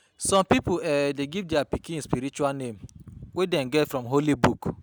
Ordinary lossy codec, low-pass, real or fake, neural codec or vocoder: none; none; real; none